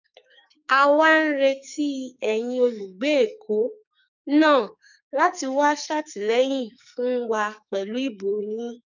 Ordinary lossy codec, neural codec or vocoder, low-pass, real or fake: none; codec, 44.1 kHz, 2.6 kbps, SNAC; 7.2 kHz; fake